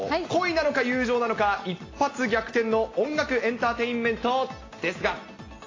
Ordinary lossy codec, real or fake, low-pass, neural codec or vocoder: AAC, 32 kbps; real; 7.2 kHz; none